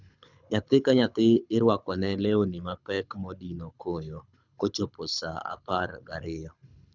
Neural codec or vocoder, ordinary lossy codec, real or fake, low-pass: codec, 24 kHz, 6 kbps, HILCodec; none; fake; 7.2 kHz